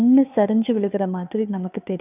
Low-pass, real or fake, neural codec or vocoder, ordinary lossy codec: 3.6 kHz; fake; codec, 16 kHz, 2 kbps, FunCodec, trained on Chinese and English, 25 frames a second; none